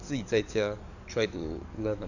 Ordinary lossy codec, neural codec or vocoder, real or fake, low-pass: none; codec, 44.1 kHz, 7.8 kbps, DAC; fake; 7.2 kHz